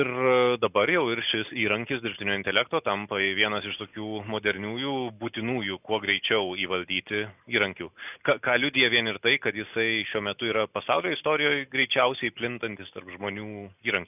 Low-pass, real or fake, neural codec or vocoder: 3.6 kHz; real; none